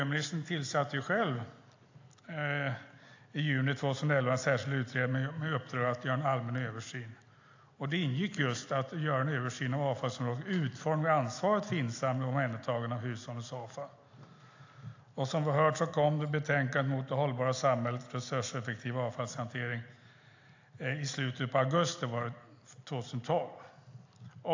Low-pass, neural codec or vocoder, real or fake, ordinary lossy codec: 7.2 kHz; none; real; AAC, 48 kbps